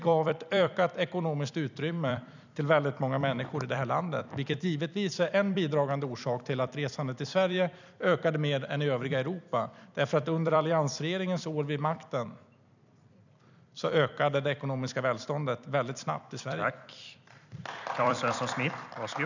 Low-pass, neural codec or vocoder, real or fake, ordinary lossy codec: 7.2 kHz; vocoder, 44.1 kHz, 128 mel bands every 256 samples, BigVGAN v2; fake; none